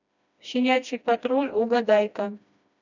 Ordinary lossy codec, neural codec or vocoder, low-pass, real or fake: none; codec, 16 kHz, 1 kbps, FreqCodec, smaller model; 7.2 kHz; fake